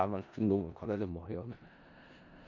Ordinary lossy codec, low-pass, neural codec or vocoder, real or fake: none; 7.2 kHz; codec, 16 kHz in and 24 kHz out, 0.4 kbps, LongCat-Audio-Codec, four codebook decoder; fake